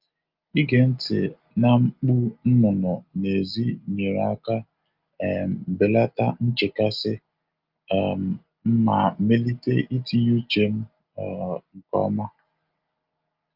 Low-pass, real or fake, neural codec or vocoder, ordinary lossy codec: 5.4 kHz; real; none; Opus, 24 kbps